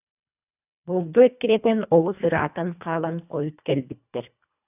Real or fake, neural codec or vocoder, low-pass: fake; codec, 24 kHz, 1.5 kbps, HILCodec; 3.6 kHz